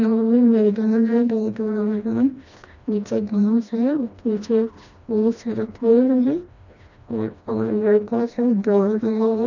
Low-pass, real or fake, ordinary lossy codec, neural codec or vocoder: 7.2 kHz; fake; none; codec, 16 kHz, 1 kbps, FreqCodec, smaller model